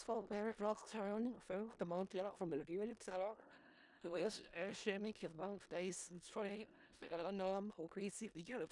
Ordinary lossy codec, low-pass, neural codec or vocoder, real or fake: none; 10.8 kHz; codec, 16 kHz in and 24 kHz out, 0.4 kbps, LongCat-Audio-Codec, four codebook decoder; fake